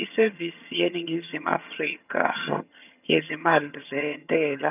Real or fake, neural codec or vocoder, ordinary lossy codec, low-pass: fake; vocoder, 22.05 kHz, 80 mel bands, HiFi-GAN; none; 3.6 kHz